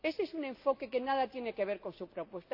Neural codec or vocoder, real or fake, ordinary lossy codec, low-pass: none; real; AAC, 48 kbps; 5.4 kHz